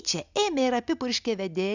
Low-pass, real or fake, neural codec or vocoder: 7.2 kHz; real; none